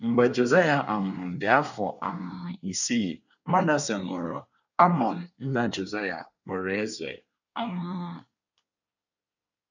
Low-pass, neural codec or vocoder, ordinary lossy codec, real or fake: 7.2 kHz; codec, 24 kHz, 1 kbps, SNAC; none; fake